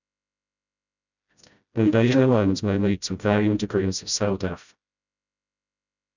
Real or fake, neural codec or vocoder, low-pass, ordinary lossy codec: fake; codec, 16 kHz, 0.5 kbps, FreqCodec, smaller model; 7.2 kHz; none